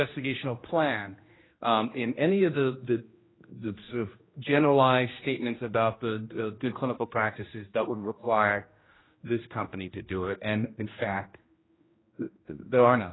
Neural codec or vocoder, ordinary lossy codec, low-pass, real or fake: codec, 16 kHz, 1 kbps, X-Codec, HuBERT features, trained on general audio; AAC, 16 kbps; 7.2 kHz; fake